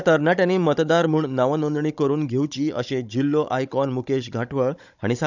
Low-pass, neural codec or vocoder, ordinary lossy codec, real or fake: 7.2 kHz; codec, 16 kHz, 16 kbps, FunCodec, trained on Chinese and English, 50 frames a second; none; fake